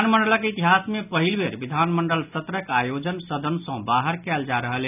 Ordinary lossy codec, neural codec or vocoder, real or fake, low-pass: none; none; real; 3.6 kHz